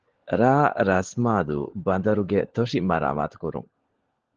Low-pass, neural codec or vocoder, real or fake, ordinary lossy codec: 7.2 kHz; codec, 16 kHz, 16 kbps, FunCodec, trained on LibriTTS, 50 frames a second; fake; Opus, 16 kbps